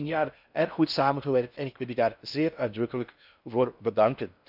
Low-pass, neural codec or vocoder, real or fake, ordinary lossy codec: 5.4 kHz; codec, 16 kHz in and 24 kHz out, 0.6 kbps, FocalCodec, streaming, 4096 codes; fake; none